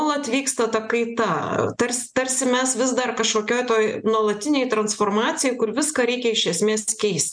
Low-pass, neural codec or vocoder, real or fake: 9.9 kHz; none; real